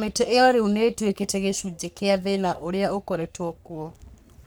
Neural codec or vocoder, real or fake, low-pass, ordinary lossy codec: codec, 44.1 kHz, 3.4 kbps, Pupu-Codec; fake; none; none